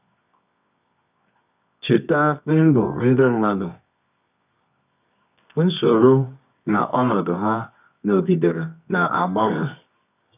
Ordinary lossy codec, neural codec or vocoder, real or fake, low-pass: none; codec, 24 kHz, 0.9 kbps, WavTokenizer, medium music audio release; fake; 3.6 kHz